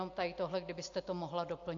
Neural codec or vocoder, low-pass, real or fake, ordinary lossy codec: none; 7.2 kHz; real; AAC, 48 kbps